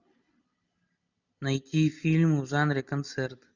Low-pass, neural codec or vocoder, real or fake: 7.2 kHz; none; real